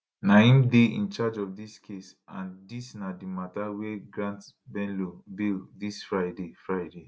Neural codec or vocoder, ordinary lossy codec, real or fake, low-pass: none; none; real; none